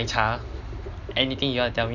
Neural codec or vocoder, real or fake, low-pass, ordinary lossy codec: none; real; 7.2 kHz; none